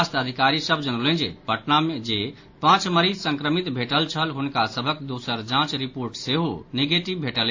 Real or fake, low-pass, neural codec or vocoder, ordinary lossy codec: real; 7.2 kHz; none; AAC, 48 kbps